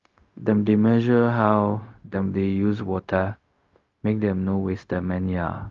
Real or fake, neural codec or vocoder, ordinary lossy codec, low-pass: fake; codec, 16 kHz, 0.4 kbps, LongCat-Audio-Codec; Opus, 32 kbps; 7.2 kHz